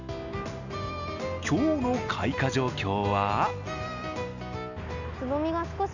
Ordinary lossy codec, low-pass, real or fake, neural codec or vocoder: none; 7.2 kHz; real; none